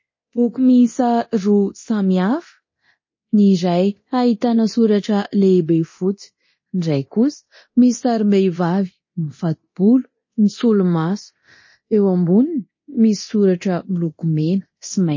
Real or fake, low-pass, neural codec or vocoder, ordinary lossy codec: fake; 7.2 kHz; codec, 24 kHz, 0.9 kbps, DualCodec; MP3, 32 kbps